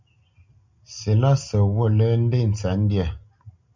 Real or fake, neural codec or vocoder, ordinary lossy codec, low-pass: real; none; MP3, 64 kbps; 7.2 kHz